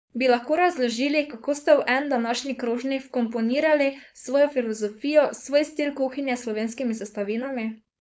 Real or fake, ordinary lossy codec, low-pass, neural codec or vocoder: fake; none; none; codec, 16 kHz, 4.8 kbps, FACodec